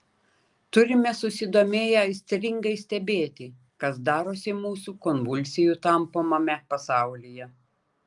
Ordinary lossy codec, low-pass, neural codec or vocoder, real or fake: Opus, 32 kbps; 10.8 kHz; none; real